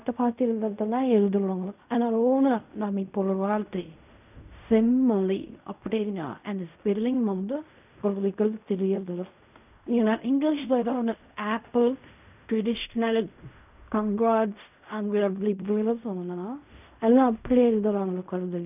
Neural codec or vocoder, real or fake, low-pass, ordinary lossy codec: codec, 16 kHz in and 24 kHz out, 0.4 kbps, LongCat-Audio-Codec, fine tuned four codebook decoder; fake; 3.6 kHz; none